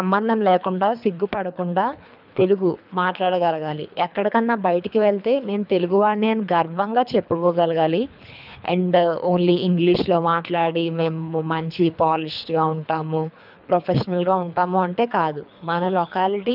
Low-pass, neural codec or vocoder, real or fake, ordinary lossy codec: 5.4 kHz; codec, 24 kHz, 3 kbps, HILCodec; fake; none